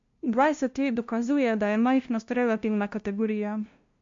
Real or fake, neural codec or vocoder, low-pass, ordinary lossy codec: fake; codec, 16 kHz, 0.5 kbps, FunCodec, trained on LibriTTS, 25 frames a second; 7.2 kHz; MP3, 48 kbps